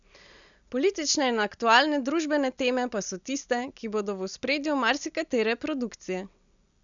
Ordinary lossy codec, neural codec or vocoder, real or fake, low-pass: none; none; real; 7.2 kHz